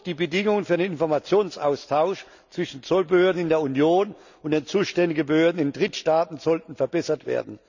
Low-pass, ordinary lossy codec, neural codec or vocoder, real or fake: 7.2 kHz; none; none; real